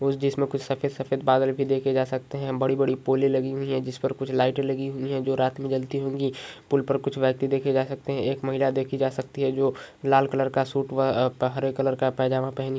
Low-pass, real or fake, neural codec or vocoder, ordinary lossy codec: none; real; none; none